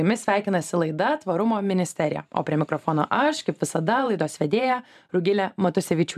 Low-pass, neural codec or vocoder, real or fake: 14.4 kHz; vocoder, 44.1 kHz, 128 mel bands every 512 samples, BigVGAN v2; fake